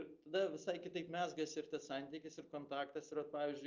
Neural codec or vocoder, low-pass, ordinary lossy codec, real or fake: none; 7.2 kHz; Opus, 24 kbps; real